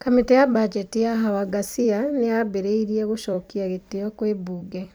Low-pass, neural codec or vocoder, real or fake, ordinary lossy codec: none; vocoder, 44.1 kHz, 128 mel bands every 256 samples, BigVGAN v2; fake; none